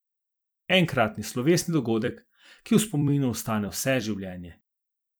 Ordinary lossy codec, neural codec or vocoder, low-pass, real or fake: none; vocoder, 44.1 kHz, 128 mel bands every 256 samples, BigVGAN v2; none; fake